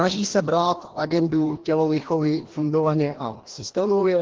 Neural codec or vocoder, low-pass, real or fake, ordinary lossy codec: codec, 16 kHz, 1 kbps, FreqCodec, larger model; 7.2 kHz; fake; Opus, 16 kbps